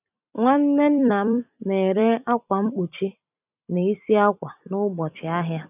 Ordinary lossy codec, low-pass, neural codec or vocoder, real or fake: none; 3.6 kHz; vocoder, 44.1 kHz, 128 mel bands every 256 samples, BigVGAN v2; fake